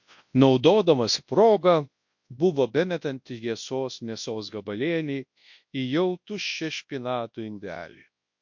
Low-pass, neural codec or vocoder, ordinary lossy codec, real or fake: 7.2 kHz; codec, 24 kHz, 0.9 kbps, WavTokenizer, large speech release; MP3, 48 kbps; fake